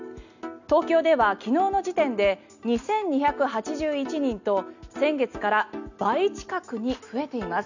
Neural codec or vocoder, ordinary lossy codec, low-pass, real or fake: none; none; 7.2 kHz; real